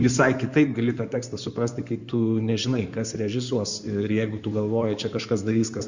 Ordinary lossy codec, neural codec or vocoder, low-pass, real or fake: Opus, 64 kbps; codec, 16 kHz in and 24 kHz out, 2.2 kbps, FireRedTTS-2 codec; 7.2 kHz; fake